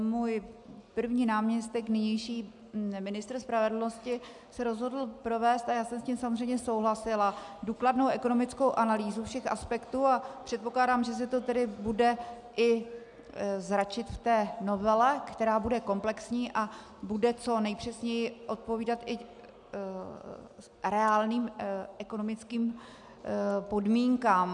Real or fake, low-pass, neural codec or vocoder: real; 10.8 kHz; none